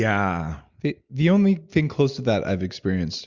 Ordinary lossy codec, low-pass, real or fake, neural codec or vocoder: Opus, 64 kbps; 7.2 kHz; real; none